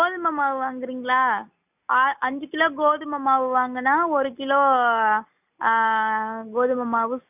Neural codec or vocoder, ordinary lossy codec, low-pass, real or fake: none; none; 3.6 kHz; real